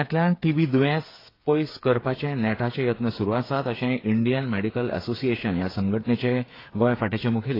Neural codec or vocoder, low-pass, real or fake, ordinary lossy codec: codec, 16 kHz, 8 kbps, FreqCodec, smaller model; 5.4 kHz; fake; AAC, 24 kbps